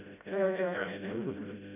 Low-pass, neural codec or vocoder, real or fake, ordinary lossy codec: 3.6 kHz; codec, 16 kHz, 0.5 kbps, FreqCodec, smaller model; fake; none